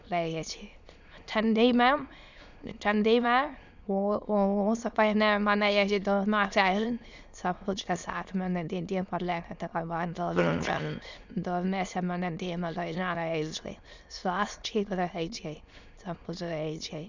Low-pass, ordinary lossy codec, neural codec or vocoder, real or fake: 7.2 kHz; none; autoencoder, 22.05 kHz, a latent of 192 numbers a frame, VITS, trained on many speakers; fake